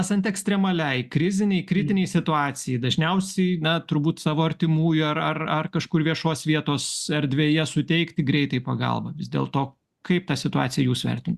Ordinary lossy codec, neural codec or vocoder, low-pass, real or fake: Opus, 64 kbps; none; 14.4 kHz; real